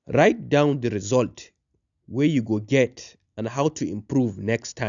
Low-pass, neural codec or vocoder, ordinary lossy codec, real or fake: 7.2 kHz; none; none; real